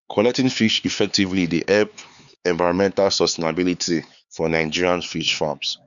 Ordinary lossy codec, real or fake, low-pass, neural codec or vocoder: none; fake; 7.2 kHz; codec, 16 kHz, 4 kbps, X-Codec, HuBERT features, trained on LibriSpeech